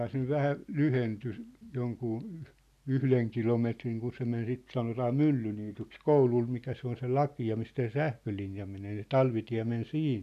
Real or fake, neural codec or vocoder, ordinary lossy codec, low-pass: real; none; none; 14.4 kHz